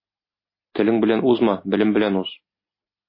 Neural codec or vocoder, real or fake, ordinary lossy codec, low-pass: none; real; MP3, 24 kbps; 5.4 kHz